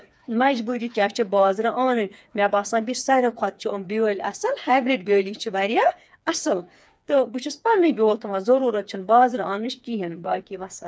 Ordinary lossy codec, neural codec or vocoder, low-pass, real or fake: none; codec, 16 kHz, 4 kbps, FreqCodec, smaller model; none; fake